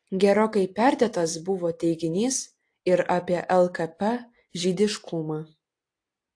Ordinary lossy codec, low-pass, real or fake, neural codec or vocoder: AAC, 48 kbps; 9.9 kHz; real; none